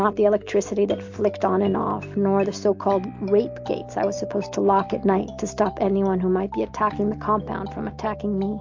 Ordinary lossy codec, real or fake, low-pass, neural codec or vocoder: MP3, 48 kbps; real; 7.2 kHz; none